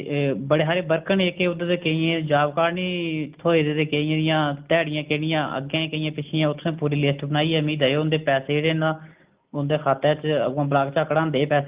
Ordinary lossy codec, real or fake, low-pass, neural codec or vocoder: Opus, 32 kbps; real; 3.6 kHz; none